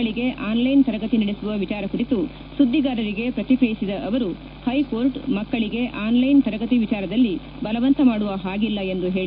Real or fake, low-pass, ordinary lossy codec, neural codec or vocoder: real; 5.4 kHz; none; none